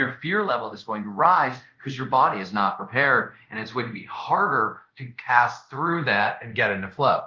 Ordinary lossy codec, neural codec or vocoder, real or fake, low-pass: Opus, 32 kbps; codec, 24 kHz, 0.5 kbps, DualCodec; fake; 7.2 kHz